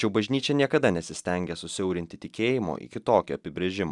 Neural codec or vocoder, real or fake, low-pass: none; real; 10.8 kHz